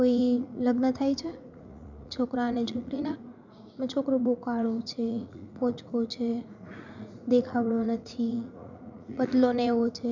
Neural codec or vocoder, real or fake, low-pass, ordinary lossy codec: vocoder, 44.1 kHz, 80 mel bands, Vocos; fake; 7.2 kHz; none